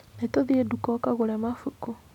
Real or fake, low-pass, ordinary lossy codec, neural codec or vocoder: real; 19.8 kHz; none; none